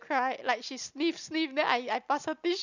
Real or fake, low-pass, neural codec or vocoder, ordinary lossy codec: real; 7.2 kHz; none; none